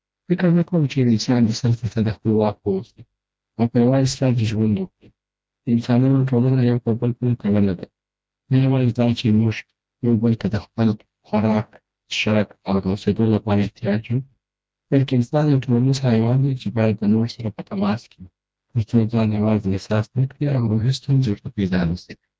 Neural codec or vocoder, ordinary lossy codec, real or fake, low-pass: codec, 16 kHz, 1 kbps, FreqCodec, smaller model; none; fake; none